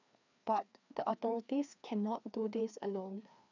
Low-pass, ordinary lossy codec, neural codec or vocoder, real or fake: 7.2 kHz; none; codec, 16 kHz, 2 kbps, FreqCodec, larger model; fake